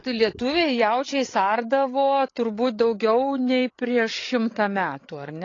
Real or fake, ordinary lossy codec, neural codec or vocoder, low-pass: fake; AAC, 32 kbps; codec, 16 kHz, 8 kbps, FreqCodec, larger model; 7.2 kHz